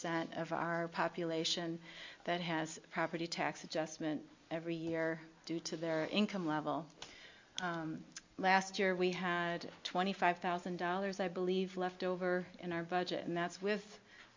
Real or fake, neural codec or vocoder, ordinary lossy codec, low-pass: real; none; MP3, 64 kbps; 7.2 kHz